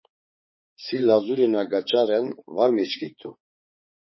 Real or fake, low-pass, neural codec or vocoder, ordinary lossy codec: fake; 7.2 kHz; codec, 16 kHz, 4 kbps, X-Codec, HuBERT features, trained on balanced general audio; MP3, 24 kbps